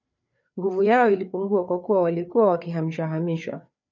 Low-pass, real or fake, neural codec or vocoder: 7.2 kHz; fake; codec, 16 kHz, 4 kbps, FreqCodec, larger model